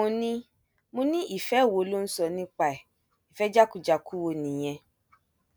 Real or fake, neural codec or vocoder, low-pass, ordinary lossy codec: real; none; none; none